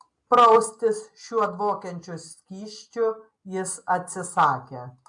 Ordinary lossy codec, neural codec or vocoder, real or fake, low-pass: AAC, 64 kbps; none; real; 10.8 kHz